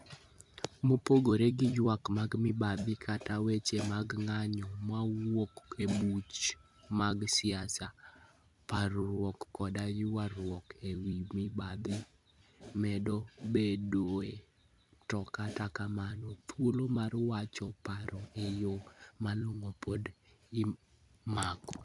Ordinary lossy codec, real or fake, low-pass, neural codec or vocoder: none; fake; 10.8 kHz; vocoder, 44.1 kHz, 128 mel bands every 256 samples, BigVGAN v2